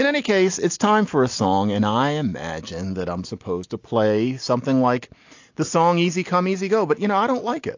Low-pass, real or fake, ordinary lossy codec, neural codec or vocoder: 7.2 kHz; real; AAC, 48 kbps; none